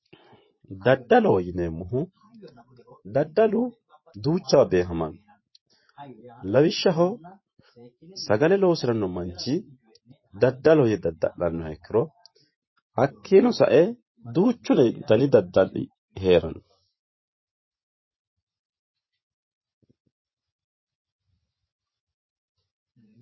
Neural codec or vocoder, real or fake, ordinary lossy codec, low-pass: vocoder, 24 kHz, 100 mel bands, Vocos; fake; MP3, 24 kbps; 7.2 kHz